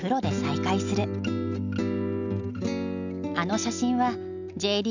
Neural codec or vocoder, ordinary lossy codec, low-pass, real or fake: none; none; 7.2 kHz; real